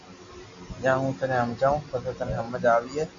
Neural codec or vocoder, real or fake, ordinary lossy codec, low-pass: none; real; MP3, 64 kbps; 7.2 kHz